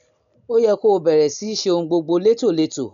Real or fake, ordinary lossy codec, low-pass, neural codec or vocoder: real; none; 7.2 kHz; none